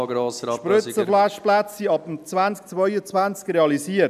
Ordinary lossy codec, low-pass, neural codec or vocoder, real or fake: none; 14.4 kHz; none; real